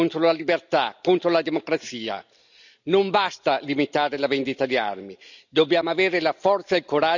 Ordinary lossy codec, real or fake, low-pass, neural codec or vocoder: none; real; 7.2 kHz; none